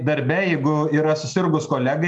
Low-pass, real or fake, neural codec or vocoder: 10.8 kHz; real; none